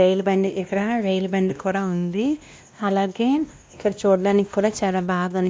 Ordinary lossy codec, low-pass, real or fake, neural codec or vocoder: none; none; fake; codec, 16 kHz, 1 kbps, X-Codec, WavLM features, trained on Multilingual LibriSpeech